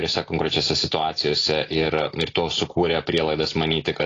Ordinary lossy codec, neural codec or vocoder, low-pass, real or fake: AAC, 32 kbps; none; 7.2 kHz; real